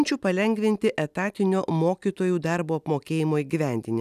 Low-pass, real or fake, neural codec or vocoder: 14.4 kHz; real; none